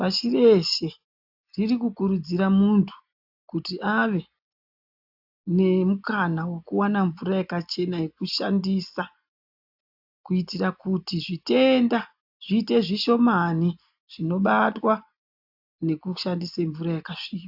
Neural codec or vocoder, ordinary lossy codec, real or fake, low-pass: none; AAC, 48 kbps; real; 5.4 kHz